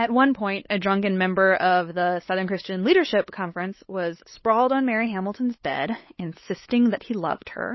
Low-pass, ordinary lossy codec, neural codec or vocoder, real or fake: 7.2 kHz; MP3, 24 kbps; codec, 16 kHz, 8 kbps, FunCodec, trained on LibriTTS, 25 frames a second; fake